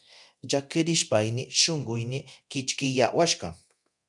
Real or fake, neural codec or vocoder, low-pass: fake; codec, 24 kHz, 0.9 kbps, DualCodec; 10.8 kHz